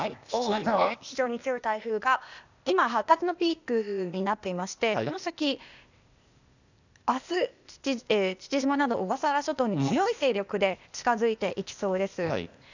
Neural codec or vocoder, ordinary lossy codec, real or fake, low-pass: codec, 16 kHz, 0.8 kbps, ZipCodec; none; fake; 7.2 kHz